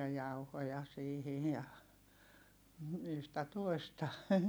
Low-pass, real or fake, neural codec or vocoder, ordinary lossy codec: none; real; none; none